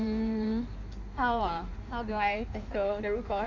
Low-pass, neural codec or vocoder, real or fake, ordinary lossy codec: 7.2 kHz; codec, 16 kHz in and 24 kHz out, 1.1 kbps, FireRedTTS-2 codec; fake; none